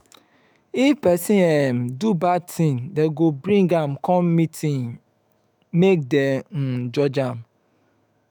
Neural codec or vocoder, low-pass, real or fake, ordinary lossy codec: autoencoder, 48 kHz, 128 numbers a frame, DAC-VAE, trained on Japanese speech; none; fake; none